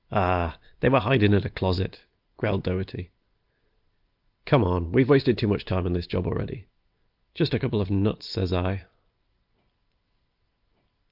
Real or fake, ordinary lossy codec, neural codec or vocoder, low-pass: real; Opus, 32 kbps; none; 5.4 kHz